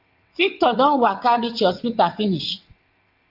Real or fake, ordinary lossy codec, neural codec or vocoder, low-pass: fake; Opus, 24 kbps; vocoder, 22.05 kHz, 80 mel bands, WaveNeXt; 5.4 kHz